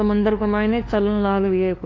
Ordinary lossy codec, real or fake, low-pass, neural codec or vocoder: AAC, 32 kbps; fake; 7.2 kHz; codec, 16 kHz, 2 kbps, FunCodec, trained on LibriTTS, 25 frames a second